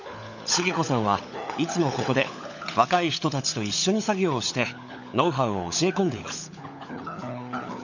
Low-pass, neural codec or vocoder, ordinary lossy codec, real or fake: 7.2 kHz; codec, 16 kHz, 16 kbps, FunCodec, trained on LibriTTS, 50 frames a second; none; fake